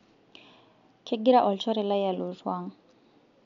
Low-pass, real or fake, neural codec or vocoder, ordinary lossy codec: 7.2 kHz; real; none; MP3, 64 kbps